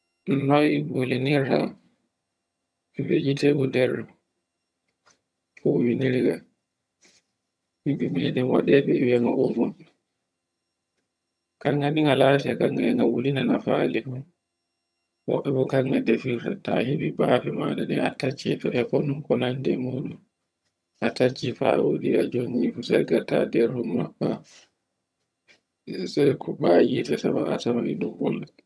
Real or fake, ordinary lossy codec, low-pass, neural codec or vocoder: fake; none; none; vocoder, 22.05 kHz, 80 mel bands, HiFi-GAN